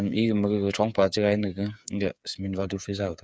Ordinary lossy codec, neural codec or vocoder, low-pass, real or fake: none; codec, 16 kHz, 8 kbps, FreqCodec, smaller model; none; fake